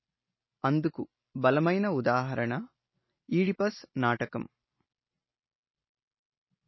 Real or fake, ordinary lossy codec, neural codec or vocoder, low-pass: real; MP3, 24 kbps; none; 7.2 kHz